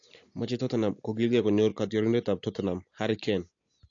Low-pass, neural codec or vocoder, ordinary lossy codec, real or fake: 7.2 kHz; none; AAC, 48 kbps; real